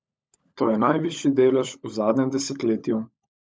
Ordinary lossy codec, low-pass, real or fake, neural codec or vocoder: none; none; fake; codec, 16 kHz, 16 kbps, FunCodec, trained on LibriTTS, 50 frames a second